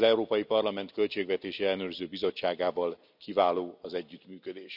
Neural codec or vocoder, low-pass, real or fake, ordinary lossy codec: none; 5.4 kHz; real; none